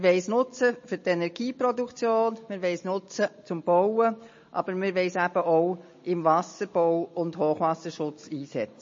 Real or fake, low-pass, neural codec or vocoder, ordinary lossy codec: real; 7.2 kHz; none; MP3, 32 kbps